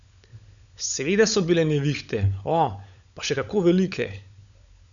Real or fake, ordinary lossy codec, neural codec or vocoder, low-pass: fake; none; codec, 16 kHz, 16 kbps, FunCodec, trained on LibriTTS, 50 frames a second; 7.2 kHz